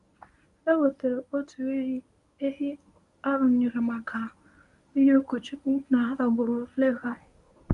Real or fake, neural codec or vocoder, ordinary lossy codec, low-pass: fake; codec, 24 kHz, 0.9 kbps, WavTokenizer, medium speech release version 1; none; 10.8 kHz